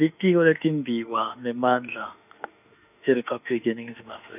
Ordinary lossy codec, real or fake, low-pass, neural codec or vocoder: none; fake; 3.6 kHz; autoencoder, 48 kHz, 32 numbers a frame, DAC-VAE, trained on Japanese speech